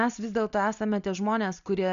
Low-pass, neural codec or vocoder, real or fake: 7.2 kHz; none; real